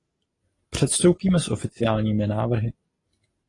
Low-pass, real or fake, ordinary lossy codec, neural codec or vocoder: 10.8 kHz; real; AAC, 32 kbps; none